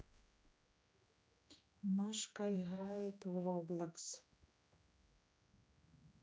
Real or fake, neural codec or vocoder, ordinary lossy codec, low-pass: fake; codec, 16 kHz, 1 kbps, X-Codec, HuBERT features, trained on general audio; none; none